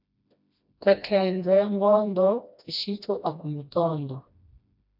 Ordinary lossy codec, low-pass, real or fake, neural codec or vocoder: AAC, 48 kbps; 5.4 kHz; fake; codec, 16 kHz, 1 kbps, FreqCodec, smaller model